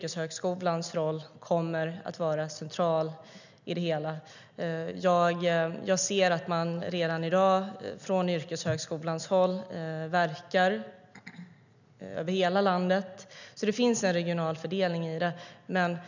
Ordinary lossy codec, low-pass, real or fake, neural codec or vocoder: none; 7.2 kHz; real; none